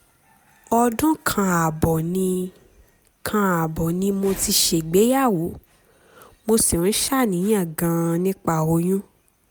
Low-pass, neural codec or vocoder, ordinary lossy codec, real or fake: none; none; none; real